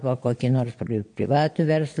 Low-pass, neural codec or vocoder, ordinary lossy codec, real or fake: 9.9 kHz; vocoder, 22.05 kHz, 80 mel bands, Vocos; MP3, 48 kbps; fake